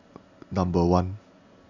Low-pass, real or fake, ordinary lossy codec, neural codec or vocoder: 7.2 kHz; real; none; none